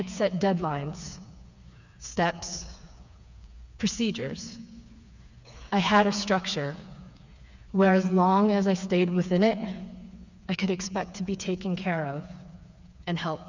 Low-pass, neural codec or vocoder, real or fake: 7.2 kHz; codec, 16 kHz, 4 kbps, FreqCodec, smaller model; fake